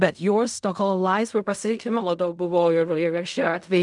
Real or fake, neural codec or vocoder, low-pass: fake; codec, 16 kHz in and 24 kHz out, 0.4 kbps, LongCat-Audio-Codec, fine tuned four codebook decoder; 10.8 kHz